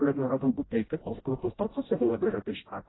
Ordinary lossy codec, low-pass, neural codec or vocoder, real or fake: AAC, 16 kbps; 7.2 kHz; codec, 16 kHz, 0.5 kbps, FreqCodec, smaller model; fake